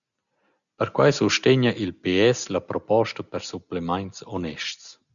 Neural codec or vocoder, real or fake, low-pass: none; real; 7.2 kHz